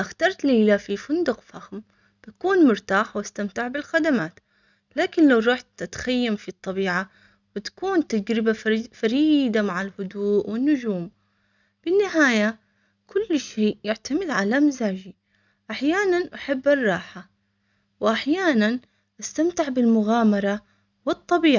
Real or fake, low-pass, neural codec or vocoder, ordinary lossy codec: real; 7.2 kHz; none; none